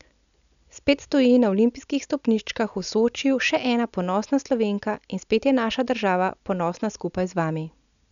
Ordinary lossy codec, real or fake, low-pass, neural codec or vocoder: none; real; 7.2 kHz; none